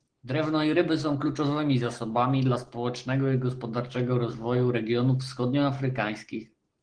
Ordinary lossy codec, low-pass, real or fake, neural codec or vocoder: Opus, 16 kbps; 9.9 kHz; real; none